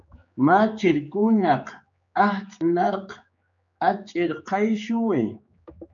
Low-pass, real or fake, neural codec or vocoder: 7.2 kHz; fake; codec, 16 kHz, 4 kbps, X-Codec, HuBERT features, trained on general audio